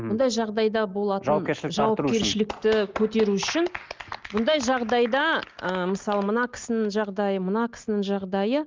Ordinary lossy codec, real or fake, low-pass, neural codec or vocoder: Opus, 24 kbps; real; 7.2 kHz; none